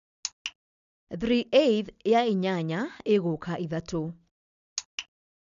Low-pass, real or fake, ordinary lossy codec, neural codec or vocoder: 7.2 kHz; real; none; none